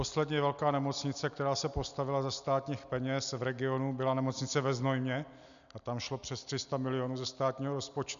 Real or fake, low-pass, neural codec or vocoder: real; 7.2 kHz; none